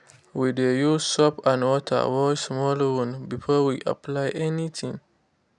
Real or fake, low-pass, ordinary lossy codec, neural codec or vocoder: real; 10.8 kHz; none; none